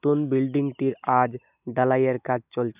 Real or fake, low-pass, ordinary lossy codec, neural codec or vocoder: real; 3.6 kHz; none; none